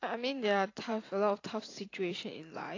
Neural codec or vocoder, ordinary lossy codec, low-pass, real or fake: vocoder, 44.1 kHz, 128 mel bands every 512 samples, BigVGAN v2; AAC, 32 kbps; 7.2 kHz; fake